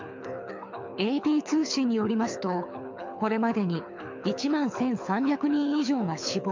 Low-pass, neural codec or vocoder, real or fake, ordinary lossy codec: 7.2 kHz; codec, 24 kHz, 6 kbps, HILCodec; fake; MP3, 64 kbps